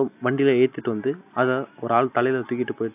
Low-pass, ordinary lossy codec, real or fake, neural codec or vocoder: 3.6 kHz; AAC, 32 kbps; real; none